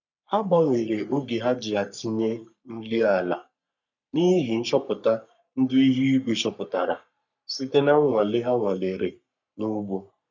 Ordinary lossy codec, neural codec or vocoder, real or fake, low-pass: none; codec, 44.1 kHz, 3.4 kbps, Pupu-Codec; fake; 7.2 kHz